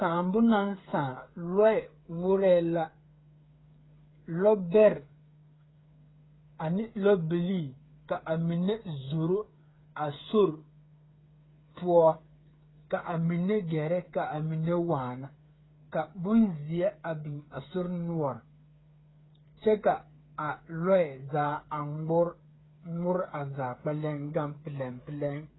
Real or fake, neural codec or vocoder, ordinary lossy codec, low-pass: fake; codec, 16 kHz, 8 kbps, FreqCodec, smaller model; AAC, 16 kbps; 7.2 kHz